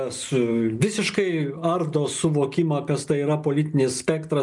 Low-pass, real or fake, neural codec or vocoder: 10.8 kHz; real; none